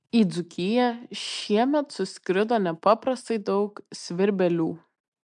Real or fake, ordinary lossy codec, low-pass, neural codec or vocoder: real; MP3, 64 kbps; 10.8 kHz; none